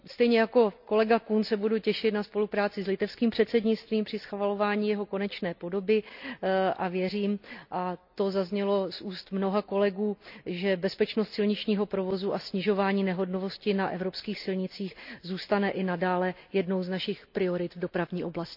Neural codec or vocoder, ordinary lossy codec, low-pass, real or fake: none; none; 5.4 kHz; real